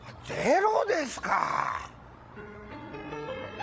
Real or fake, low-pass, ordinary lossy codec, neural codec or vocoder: fake; none; none; codec, 16 kHz, 16 kbps, FreqCodec, larger model